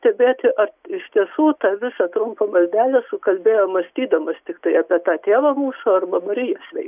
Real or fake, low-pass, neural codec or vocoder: real; 3.6 kHz; none